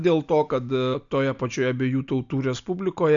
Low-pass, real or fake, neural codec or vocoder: 7.2 kHz; real; none